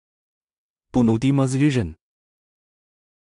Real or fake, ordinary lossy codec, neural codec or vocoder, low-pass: fake; AAC, 64 kbps; codec, 16 kHz in and 24 kHz out, 0.4 kbps, LongCat-Audio-Codec, two codebook decoder; 10.8 kHz